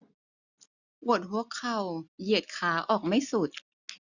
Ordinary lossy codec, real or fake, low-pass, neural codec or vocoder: none; real; 7.2 kHz; none